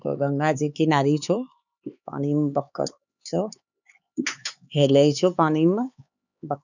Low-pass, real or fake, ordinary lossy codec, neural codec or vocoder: 7.2 kHz; fake; none; codec, 16 kHz, 4 kbps, X-Codec, HuBERT features, trained on LibriSpeech